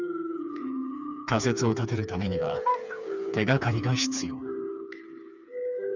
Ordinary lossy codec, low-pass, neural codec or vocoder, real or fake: none; 7.2 kHz; codec, 16 kHz, 4 kbps, FreqCodec, smaller model; fake